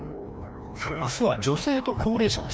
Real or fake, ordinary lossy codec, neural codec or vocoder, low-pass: fake; none; codec, 16 kHz, 1 kbps, FreqCodec, larger model; none